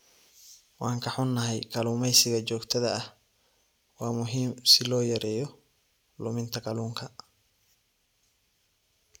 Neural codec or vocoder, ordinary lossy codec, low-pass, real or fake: none; none; 19.8 kHz; real